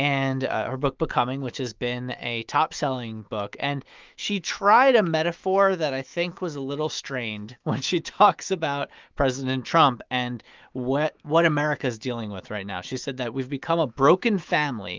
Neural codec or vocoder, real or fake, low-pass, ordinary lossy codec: autoencoder, 48 kHz, 128 numbers a frame, DAC-VAE, trained on Japanese speech; fake; 7.2 kHz; Opus, 24 kbps